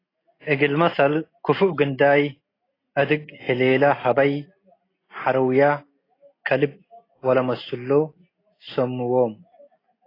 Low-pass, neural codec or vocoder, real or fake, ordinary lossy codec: 5.4 kHz; none; real; AAC, 24 kbps